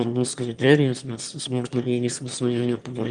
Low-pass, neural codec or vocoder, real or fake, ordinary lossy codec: 9.9 kHz; autoencoder, 22.05 kHz, a latent of 192 numbers a frame, VITS, trained on one speaker; fake; Opus, 32 kbps